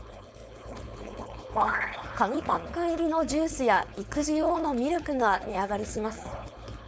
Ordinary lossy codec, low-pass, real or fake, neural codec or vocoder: none; none; fake; codec, 16 kHz, 4.8 kbps, FACodec